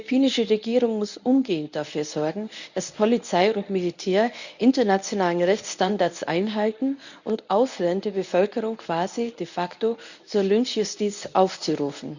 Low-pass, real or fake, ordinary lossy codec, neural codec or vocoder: 7.2 kHz; fake; none; codec, 24 kHz, 0.9 kbps, WavTokenizer, medium speech release version 1